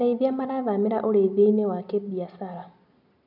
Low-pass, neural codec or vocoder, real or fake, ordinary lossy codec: 5.4 kHz; none; real; none